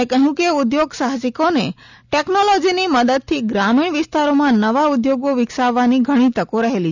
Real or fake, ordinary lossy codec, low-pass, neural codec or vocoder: real; none; 7.2 kHz; none